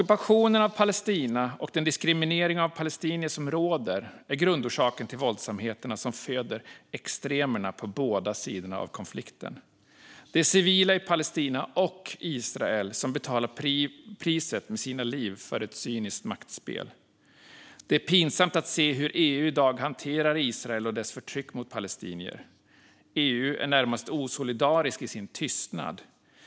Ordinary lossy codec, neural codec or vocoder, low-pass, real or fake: none; none; none; real